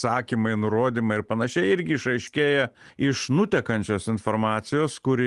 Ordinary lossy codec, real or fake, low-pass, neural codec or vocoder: Opus, 24 kbps; real; 10.8 kHz; none